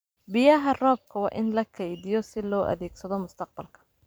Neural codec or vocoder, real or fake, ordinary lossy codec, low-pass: vocoder, 44.1 kHz, 128 mel bands every 512 samples, BigVGAN v2; fake; none; none